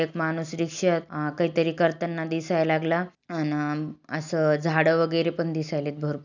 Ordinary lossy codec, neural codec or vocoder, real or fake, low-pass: none; none; real; 7.2 kHz